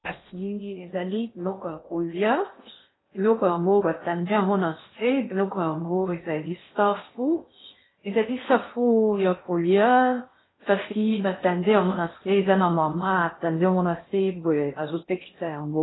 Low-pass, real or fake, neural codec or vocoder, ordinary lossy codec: 7.2 kHz; fake; codec, 16 kHz in and 24 kHz out, 0.6 kbps, FocalCodec, streaming, 4096 codes; AAC, 16 kbps